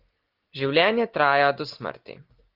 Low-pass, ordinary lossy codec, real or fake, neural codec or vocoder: 5.4 kHz; Opus, 24 kbps; real; none